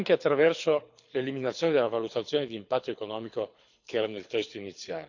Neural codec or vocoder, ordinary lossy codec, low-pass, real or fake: codec, 24 kHz, 6 kbps, HILCodec; none; 7.2 kHz; fake